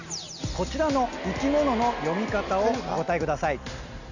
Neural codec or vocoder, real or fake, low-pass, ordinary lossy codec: none; real; 7.2 kHz; none